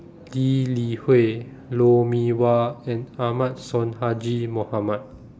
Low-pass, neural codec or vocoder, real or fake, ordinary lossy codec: none; none; real; none